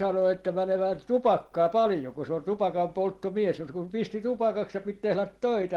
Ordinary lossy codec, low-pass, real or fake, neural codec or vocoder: Opus, 16 kbps; 19.8 kHz; real; none